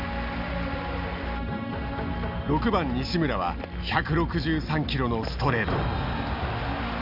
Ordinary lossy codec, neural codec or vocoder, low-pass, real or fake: none; none; 5.4 kHz; real